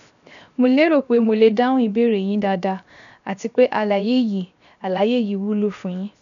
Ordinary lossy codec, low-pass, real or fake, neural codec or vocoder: none; 7.2 kHz; fake; codec, 16 kHz, 0.7 kbps, FocalCodec